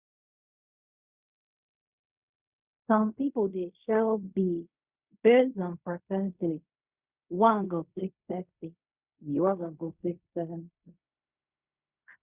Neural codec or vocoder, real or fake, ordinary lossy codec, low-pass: codec, 16 kHz in and 24 kHz out, 0.4 kbps, LongCat-Audio-Codec, fine tuned four codebook decoder; fake; Opus, 64 kbps; 3.6 kHz